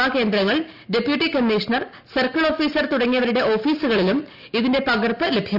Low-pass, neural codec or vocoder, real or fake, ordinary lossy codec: 5.4 kHz; none; real; none